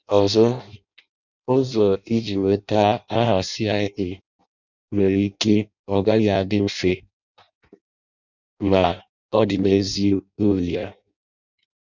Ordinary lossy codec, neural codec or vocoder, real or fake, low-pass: none; codec, 16 kHz in and 24 kHz out, 0.6 kbps, FireRedTTS-2 codec; fake; 7.2 kHz